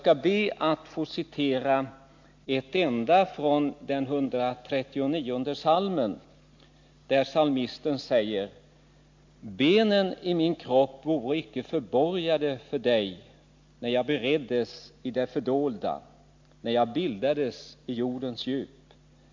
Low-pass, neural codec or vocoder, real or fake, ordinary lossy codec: 7.2 kHz; none; real; MP3, 48 kbps